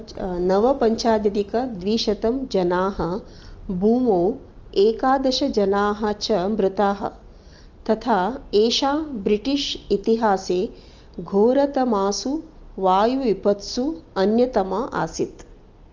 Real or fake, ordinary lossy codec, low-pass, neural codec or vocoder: real; Opus, 24 kbps; 7.2 kHz; none